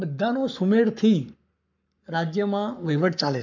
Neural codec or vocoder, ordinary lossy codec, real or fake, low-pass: codec, 44.1 kHz, 7.8 kbps, Pupu-Codec; none; fake; 7.2 kHz